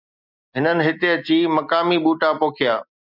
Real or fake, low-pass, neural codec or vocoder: real; 5.4 kHz; none